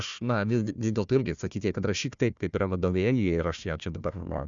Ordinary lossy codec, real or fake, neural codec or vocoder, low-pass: Opus, 64 kbps; fake; codec, 16 kHz, 1 kbps, FunCodec, trained on Chinese and English, 50 frames a second; 7.2 kHz